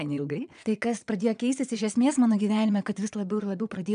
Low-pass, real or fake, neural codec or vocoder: 9.9 kHz; fake; vocoder, 22.05 kHz, 80 mel bands, Vocos